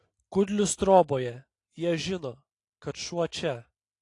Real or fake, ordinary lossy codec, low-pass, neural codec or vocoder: real; AAC, 48 kbps; 10.8 kHz; none